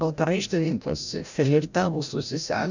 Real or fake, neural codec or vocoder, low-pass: fake; codec, 16 kHz, 0.5 kbps, FreqCodec, larger model; 7.2 kHz